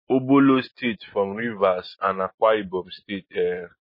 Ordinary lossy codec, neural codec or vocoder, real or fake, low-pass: MP3, 24 kbps; none; real; 5.4 kHz